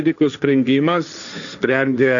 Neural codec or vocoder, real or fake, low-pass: codec, 16 kHz, 1.1 kbps, Voila-Tokenizer; fake; 7.2 kHz